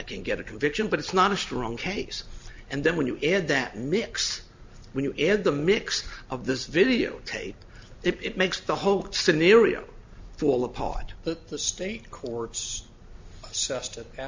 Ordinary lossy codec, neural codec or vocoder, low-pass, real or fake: MP3, 64 kbps; none; 7.2 kHz; real